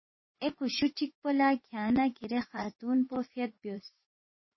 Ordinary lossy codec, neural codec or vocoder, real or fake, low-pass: MP3, 24 kbps; vocoder, 24 kHz, 100 mel bands, Vocos; fake; 7.2 kHz